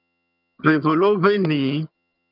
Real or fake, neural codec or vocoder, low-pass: fake; vocoder, 22.05 kHz, 80 mel bands, HiFi-GAN; 5.4 kHz